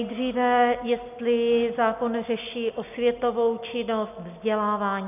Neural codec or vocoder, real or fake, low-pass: none; real; 3.6 kHz